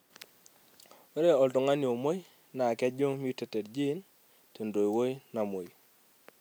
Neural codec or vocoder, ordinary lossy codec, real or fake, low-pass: none; none; real; none